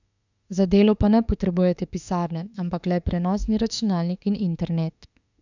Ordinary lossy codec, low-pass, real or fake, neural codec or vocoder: none; 7.2 kHz; fake; autoencoder, 48 kHz, 32 numbers a frame, DAC-VAE, trained on Japanese speech